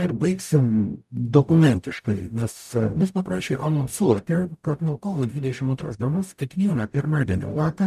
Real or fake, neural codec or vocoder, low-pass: fake; codec, 44.1 kHz, 0.9 kbps, DAC; 14.4 kHz